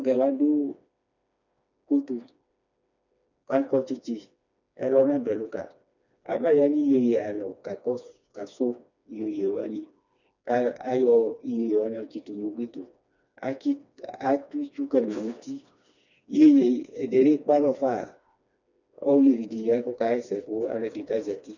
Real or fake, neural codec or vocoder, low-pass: fake; codec, 16 kHz, 2 kbps, FreqCodec, smaller model; 7.2 kHz